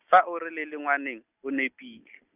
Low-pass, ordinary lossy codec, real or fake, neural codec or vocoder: 3.6 kHz; none; real; none